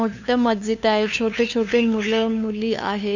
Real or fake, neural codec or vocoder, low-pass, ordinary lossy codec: fake; codec, 16 kHz, 2 kbps, FunCodec, trained on LibriTTS, 25 frames a second; 7.2 kHz; none